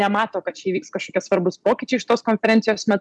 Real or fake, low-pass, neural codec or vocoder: real; 10.8 kHz; none